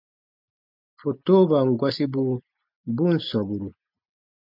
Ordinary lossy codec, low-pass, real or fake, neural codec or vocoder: MP3, 48 kbps; 5.4 kHz; real; none